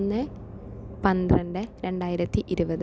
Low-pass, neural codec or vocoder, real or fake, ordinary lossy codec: none; none; real; none